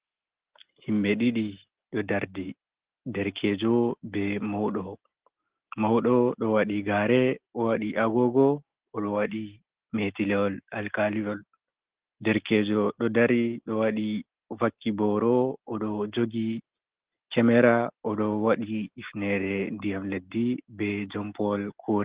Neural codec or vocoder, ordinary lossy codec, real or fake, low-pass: none; Opus, 16 kbps; real; 3.6 kHz